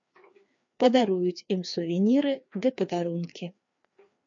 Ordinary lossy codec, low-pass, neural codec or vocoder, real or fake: MP3, 48 kbps; 7.2 kHz; codec, 16 kHz, 2 kbps, FreqCodec, larger model; fake